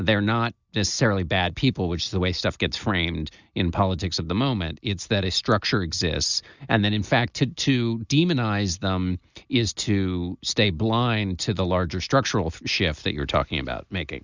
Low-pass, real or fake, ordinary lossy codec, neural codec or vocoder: 7.2 kHz; real; Opus, 64 kbps; none